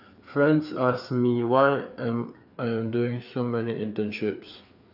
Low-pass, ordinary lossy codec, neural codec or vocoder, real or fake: 5.4 kHz; none; codec, 16 kHz, 4 kbps, FreqCodec, larger model; fake